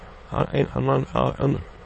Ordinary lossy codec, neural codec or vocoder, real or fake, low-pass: MP3, 32 kbps; autoencoder, 22.05 kHz, a latent of 192 numbers a frame, VITS, trained on many speakers; fake; 9.9 kHz